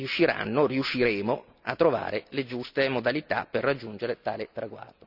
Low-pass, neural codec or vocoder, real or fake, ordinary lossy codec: 5.4 kHz; none; real; none